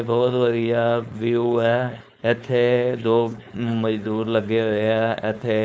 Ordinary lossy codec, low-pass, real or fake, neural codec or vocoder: none; none; fake; codec, 16 kHz, 4.8 kbps, FACodec